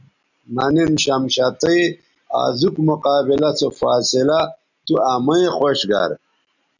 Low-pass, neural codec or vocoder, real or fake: 7.2 kHz; none; real